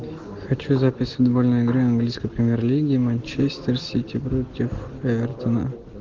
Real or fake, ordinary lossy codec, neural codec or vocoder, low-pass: real; Opus, 16 kbps; none; 7.2 kHz